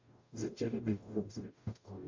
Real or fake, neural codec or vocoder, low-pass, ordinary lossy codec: fake; codec, 44.1 kHz, 0.9 kbps, DAC; 7.2 kHz; MP3, 64 kbps